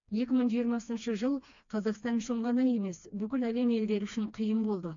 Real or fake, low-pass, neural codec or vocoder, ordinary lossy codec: fake; 7.2 kHz; codec, 16 kHz, 2 kbps, FreqCodec, smaller model; AAC, 64 kbps